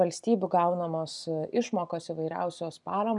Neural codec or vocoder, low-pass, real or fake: none; 10.8 kHz; real